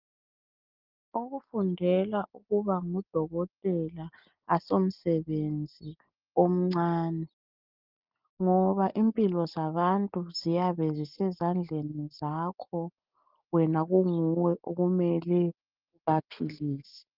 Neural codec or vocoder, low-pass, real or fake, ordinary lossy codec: none; 5.4 kHz; real; Opus, 32 kbps